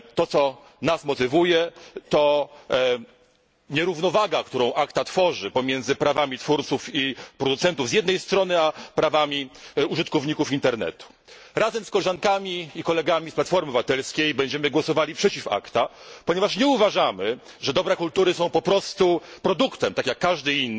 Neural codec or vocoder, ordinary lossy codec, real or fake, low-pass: none; none; real; none